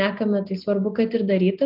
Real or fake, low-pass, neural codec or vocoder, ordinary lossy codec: real; 5.4 kHz; none; Opus, 32 kbps